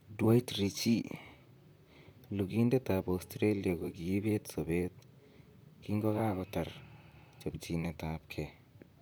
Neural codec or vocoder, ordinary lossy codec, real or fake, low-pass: vocoder, 44.1 kHz, 128 mel bands, Pupu-Vocoder; none; fake; none